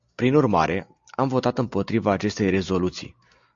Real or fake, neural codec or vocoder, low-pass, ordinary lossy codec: real; none; 7.2 kHz; AAC, 64 kbps